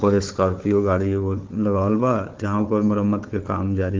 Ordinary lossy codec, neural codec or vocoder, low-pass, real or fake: Opus, 16 kbps; codec, 16 kHz, 4 kbps, FunCodec, trained on Chinese and English, 50 frames a second; 7.2 kHz; fake